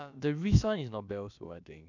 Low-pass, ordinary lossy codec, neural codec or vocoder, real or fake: 7.2 kHz; none; codec, 16 kHz, about 1 kbps, DyCAST, with the encoder's durations; fake